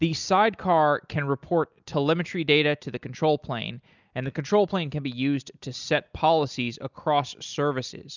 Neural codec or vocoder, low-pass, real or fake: none; 7.2 kHz; real